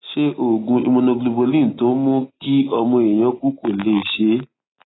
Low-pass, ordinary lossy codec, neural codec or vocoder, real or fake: 7.2 kHz; AAC, 16 kbps; none; real